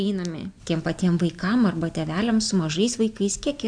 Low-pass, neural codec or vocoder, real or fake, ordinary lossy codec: 9.9 kHz; none; real; AAC, 64 kbps